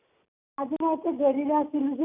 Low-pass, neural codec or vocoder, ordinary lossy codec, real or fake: 3.6 kHz; none; none; real